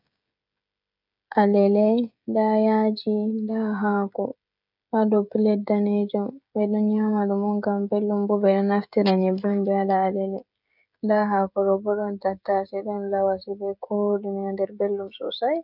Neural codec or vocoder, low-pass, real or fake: codec, 16 kHz, 16 kbps, FreqCodec, smaller model; 5.4 kHz; fake